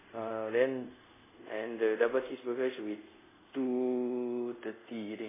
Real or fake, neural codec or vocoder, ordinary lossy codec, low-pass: fake; codec, 24 kHz, 0.5 kbps, DualCodec; MP3, 16 kbps; 3.6 kHz